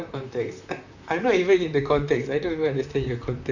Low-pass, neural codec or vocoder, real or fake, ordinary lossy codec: 7.2 kHz; vocoder, 44.1 kHz, 128 mel bands, Pupu-Vocoder; fake; none